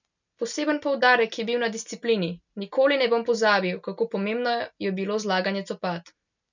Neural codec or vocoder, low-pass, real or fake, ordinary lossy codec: none; 7.2 kHz; real; none